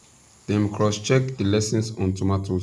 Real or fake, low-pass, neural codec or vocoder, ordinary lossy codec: real; none; none; none